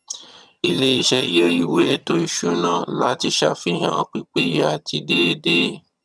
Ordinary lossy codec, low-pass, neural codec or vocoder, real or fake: none; none; vocoder, 22.05 kHz, 80 mel bands, HiFi-GAN; fake